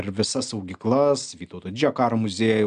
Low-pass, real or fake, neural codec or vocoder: 9.9 kHz; real; none